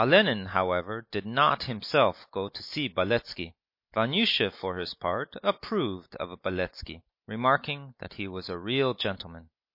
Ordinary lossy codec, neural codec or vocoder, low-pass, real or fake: MP3, 32 kbps; none; 5.4 kHz; real